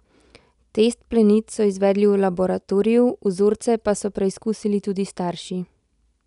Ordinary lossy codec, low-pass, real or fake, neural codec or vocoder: none; 10.8 kHz; real; none